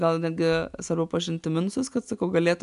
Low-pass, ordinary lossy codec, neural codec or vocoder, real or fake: 10.8 kHz; MP3, 96 kbps; none; real